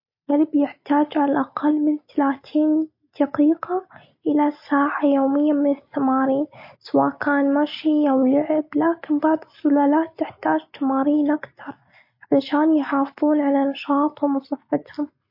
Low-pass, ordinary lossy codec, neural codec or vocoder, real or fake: 5.4 kHz; MP3, 32 kbps; none; real